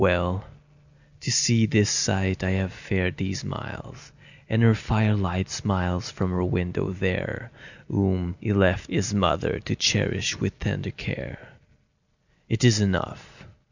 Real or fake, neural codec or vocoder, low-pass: real; none; 7.2 kHz